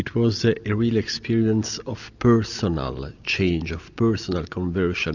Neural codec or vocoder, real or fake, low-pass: none; real; 7.2 kHz